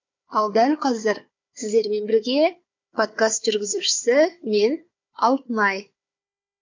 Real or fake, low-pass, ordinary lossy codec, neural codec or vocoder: fake; 7.2 kHz; MP3, 48 kbps; codec, 16 kHz, 4 kbps, FunCodec, trained on Chinese and English, 50 frames a second